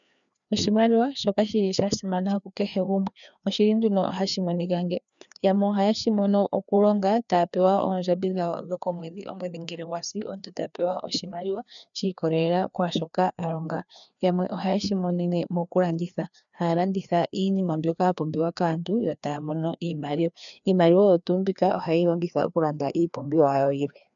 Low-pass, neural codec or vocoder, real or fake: 7.2 kHz; codec, 16 kHz, 2 kbps, FreqCodec, larger model; fake